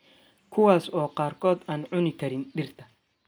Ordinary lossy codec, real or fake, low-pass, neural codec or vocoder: none; real; none; none